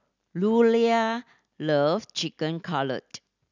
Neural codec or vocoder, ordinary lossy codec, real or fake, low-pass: none; none; real; 7.2 kHz